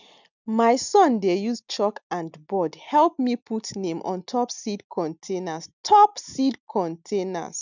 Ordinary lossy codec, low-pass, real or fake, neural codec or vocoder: none; 7.2 kHz; real; none